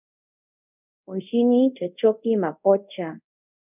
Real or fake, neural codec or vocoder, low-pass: fake; codec, 24 kHz, 0.5 kbps, DualCodec; 3.6 kHz